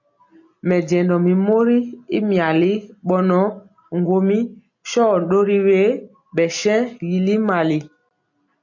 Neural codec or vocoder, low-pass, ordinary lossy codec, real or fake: none; 7.2 kHz; MP3, 64 kbps; real